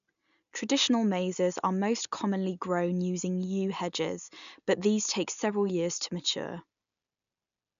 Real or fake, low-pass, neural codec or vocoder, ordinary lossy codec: real; 7.2 kHz; none; none